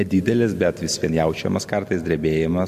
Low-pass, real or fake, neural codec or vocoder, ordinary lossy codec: 14.4 kHz; real; none; MP3, 64 kbps